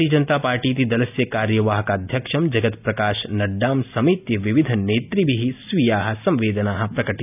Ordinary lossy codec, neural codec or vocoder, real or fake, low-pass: none; none; real; 3.6 kHz